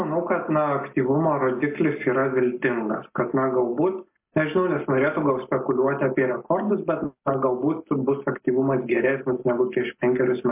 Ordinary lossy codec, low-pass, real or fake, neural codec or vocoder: MP3, 24 kbps; 3.6 kHz; real; none